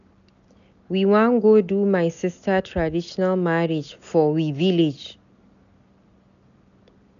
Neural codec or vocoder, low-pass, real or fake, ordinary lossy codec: none; 7.2 kHz; real; none